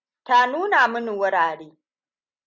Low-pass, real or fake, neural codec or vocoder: 7.2 kHz; real; none